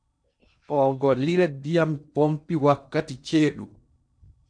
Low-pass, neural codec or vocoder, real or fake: 9.9 kHz; codec, 16 kHz in and 24 kHz out, 0.8 kbps, FocalCodec, streaming, 65536 codes; fake